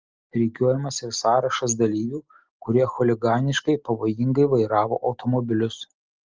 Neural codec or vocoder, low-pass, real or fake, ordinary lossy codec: none; 7.2 kHz; real; Opus, 32 kbps